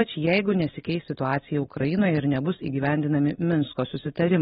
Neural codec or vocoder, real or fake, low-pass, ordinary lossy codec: none; real; 7.2 kHz; AAC, 16 kbps